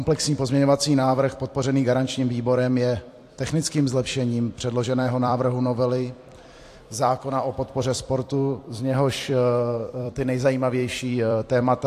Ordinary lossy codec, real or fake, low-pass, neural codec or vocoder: AAC, 64 kbps; fake; 14.4 kHz; vocoder, 44.1 kHz, 128 mel bands every 256 samples, BigVGAN v2